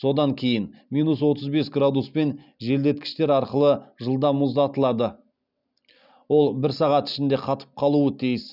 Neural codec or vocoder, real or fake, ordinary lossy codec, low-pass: none; real; none; 5.4 kHz